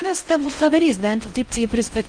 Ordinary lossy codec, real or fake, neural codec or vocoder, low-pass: Opus, 32 kbps; fake; codec, 16 kHz in and 24 kHz out, 0.6 kbps, FocalCodec, streaming, 4096 codes; 9.9 kHz